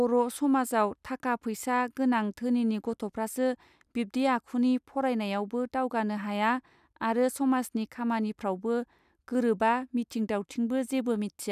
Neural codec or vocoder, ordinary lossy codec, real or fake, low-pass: none; none; real; 14.4 kHz